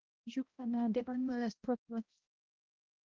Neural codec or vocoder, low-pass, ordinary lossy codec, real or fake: codec, 16 kHz, 0.5 kbps, X-Codec, HuBERT features, trained on balanced general audio; 7.2 kHz; Opus, 24 kbps; fake